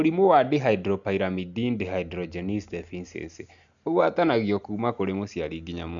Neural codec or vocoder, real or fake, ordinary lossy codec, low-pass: none; real; none; 7.2 kHz